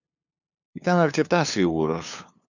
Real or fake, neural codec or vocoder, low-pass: fake; codec, 16 kHz, 2 kbps, FunCodec, trained on LibriTTS, 25 frames a second; 7.2 kHz